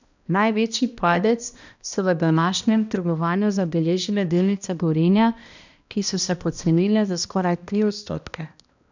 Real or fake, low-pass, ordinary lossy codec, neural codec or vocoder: fake; 7.2 kHz; none; codec, 16 kHz, 1 kbps, X-Codec, HuBERT features, trained on balanced general audio